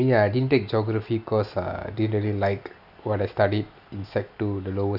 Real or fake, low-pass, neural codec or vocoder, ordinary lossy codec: real; 5.4 kHz; none; none